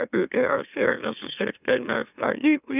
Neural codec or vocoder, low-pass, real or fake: autoencoder, 44.1 kHz, a latent of 192 numbers a frame, MeloTTS; 3.6 kHz; fake